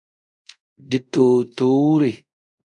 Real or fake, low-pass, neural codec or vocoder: fake; 10.8 kHz; codec, 24 kHz, 0.5 kbps, DualCodec